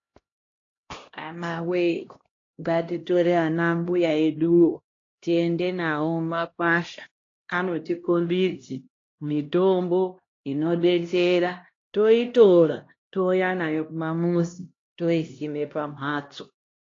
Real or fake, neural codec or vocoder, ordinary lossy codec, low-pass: fake; codec, 16 kHz, 1 kbps, X-Codec, HuBERT features, trained on LibriSpeech; AAC, 32 kbps; 7.2 kHz